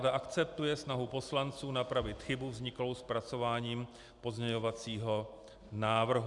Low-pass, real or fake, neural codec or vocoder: 10.8 kHz; real; none